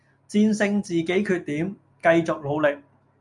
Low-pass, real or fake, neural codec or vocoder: 10.8 kHz; real; none